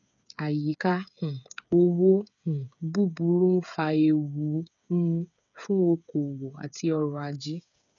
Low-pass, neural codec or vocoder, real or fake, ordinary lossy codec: 7.2 kHz; codec, 16 kHz, 8 kbps, FreqCodec, smaller model; fake; none